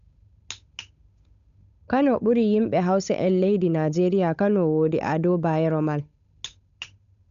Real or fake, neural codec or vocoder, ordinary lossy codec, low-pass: fake; codec, 16 kHz, 8 kbps, FunCodec, trained on Chinese and English, 25 frames a second; none; 7.2 kHz